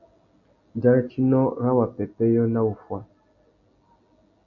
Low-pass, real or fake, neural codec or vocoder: 7.2 kHz; real; none